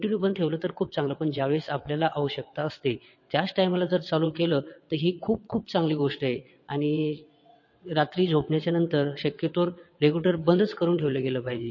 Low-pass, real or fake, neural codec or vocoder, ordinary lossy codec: 7.2 kHz; fake; vocoder, 22.05 kHz, 80 mel bands, WaveNeXt; MP3, 32 kbps